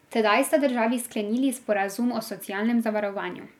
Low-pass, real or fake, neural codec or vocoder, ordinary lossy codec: 19.8 kHz; real; none; none